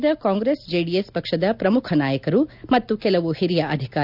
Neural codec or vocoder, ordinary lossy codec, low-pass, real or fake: none; none; 5.4 kHz; real